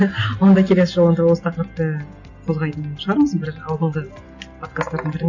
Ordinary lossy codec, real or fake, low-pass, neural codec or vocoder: none; real; 7.2 kHz; none